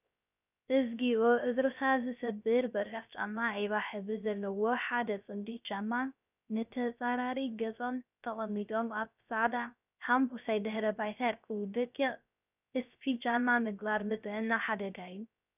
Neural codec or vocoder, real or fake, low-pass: codec, 16 kHz, 0.3 kbps, FocalCodec; fake; 3.6 kHz